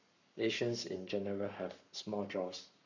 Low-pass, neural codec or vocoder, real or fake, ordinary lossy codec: 7.2 kHz; codec, 44.1 kHz, 7.8 kbps, Pupu-Codec; fake; none